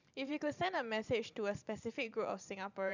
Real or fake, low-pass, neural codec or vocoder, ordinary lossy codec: fake; 7.2 kHz; vocoder, 44.1 kHz, 128 mel bands every 512 samples, BigVGAN v2; none